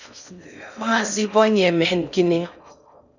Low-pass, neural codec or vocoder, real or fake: 7.2 kHz; codec, 16 kHz in and 24 kHz out, 0.6 kbps, FocalCodec, streaming, 4096 codes; fake